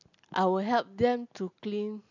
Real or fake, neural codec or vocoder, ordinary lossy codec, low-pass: real; none; none; 7.2 kHz